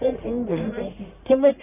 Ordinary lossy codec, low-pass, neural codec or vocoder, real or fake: none; 3.6 kHz; codec, 44.1 kHz, 1.7 kbps, Pupu-Codec; fake